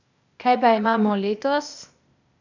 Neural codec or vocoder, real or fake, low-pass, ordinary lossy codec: codec, 16 kHz, 0.8 kbps, ZipCodec; fake; 7.2 kHz; none